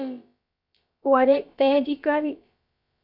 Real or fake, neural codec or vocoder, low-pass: fake; codec, 16 kHz, about 1 kbps, DyCAST, with the encoder's durations; 5.4 kHz